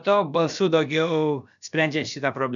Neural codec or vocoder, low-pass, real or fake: codec, 16 kHz, about 1 kbps, DyCAST, with the encoder's durations; 7.2 kHz; fake